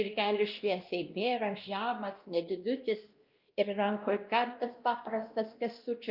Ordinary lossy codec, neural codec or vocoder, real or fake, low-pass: Opus, 24 kbps; codec, 16 kHz, 1 kbps, X-Codec, WavLM features, trained on Multilingual LibriSpeech; fake; 5.4 kHz